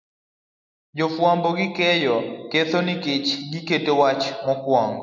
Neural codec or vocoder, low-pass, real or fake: none; 7.2 kHz; real